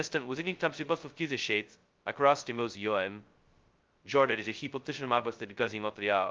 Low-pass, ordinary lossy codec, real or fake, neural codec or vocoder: 7.2 kHz; Opus, 24 kbps; fake; codec, 16 kHz, 0.2 kbps, FocalCodec